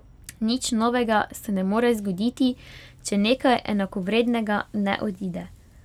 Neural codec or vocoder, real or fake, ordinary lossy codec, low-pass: none; real; none; 19.8 kHz